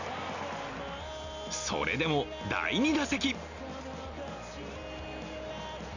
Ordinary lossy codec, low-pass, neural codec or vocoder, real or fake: none; 7.2 kHz; none; real